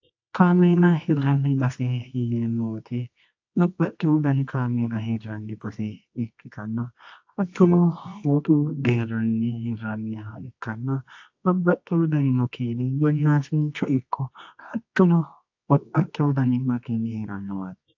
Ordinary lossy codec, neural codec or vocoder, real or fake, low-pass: AAC, 48 kbps; codec, 24 kHz, 0.9 kbps, WavTokenizer, medium music audio release; fake; 7.2 kHz